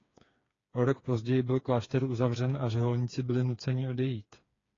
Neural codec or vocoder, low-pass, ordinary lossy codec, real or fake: codec, 16 kHz, 4 kbps, FreqCodec, smaller model; 7.2 kHz; AAC, 32 kbps; fake